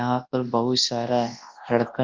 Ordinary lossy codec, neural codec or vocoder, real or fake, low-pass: Opus, 24 kbps; codec, 24 kHz, 0.9 kbps, WavTokenizer, large speech release; fake; 7.2 kHz